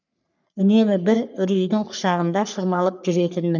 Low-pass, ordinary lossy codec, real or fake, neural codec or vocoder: 7.2 kHz; none; fake; codec, 44.1 kHz, 3.4 kbps, Pupu-Codec